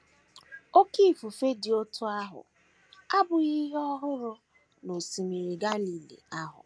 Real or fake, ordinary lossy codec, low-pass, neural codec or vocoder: real; none; none; none